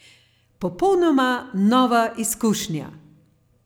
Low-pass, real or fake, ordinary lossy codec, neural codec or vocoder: none; real; none; none